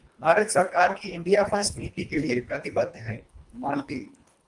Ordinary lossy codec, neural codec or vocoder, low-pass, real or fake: Opus, 32 kbps; codec, 24 kHz, 1.5 kbps, HILCodec; 10.8 kHz; fake